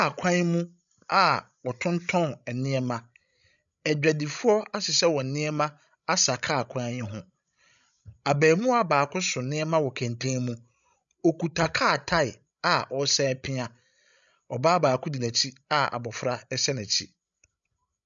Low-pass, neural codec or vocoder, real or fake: 7.2 kHz; codec, 16 kHz, 16 kbps, FreqCodec, larger model; fake